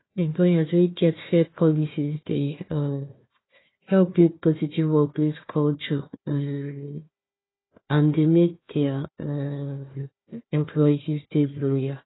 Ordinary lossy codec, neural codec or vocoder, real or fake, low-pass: AAC, 16 kbps; codec, 16 kHz, 1 kbps, FunCodec, trained on Chinese and English, 50 frames a second; fake; 7.2 kHz